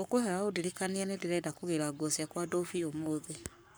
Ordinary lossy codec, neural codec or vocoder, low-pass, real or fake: none; codec, 44.1 kHz, 7.8 kbps, Pupu-Codec; none; fake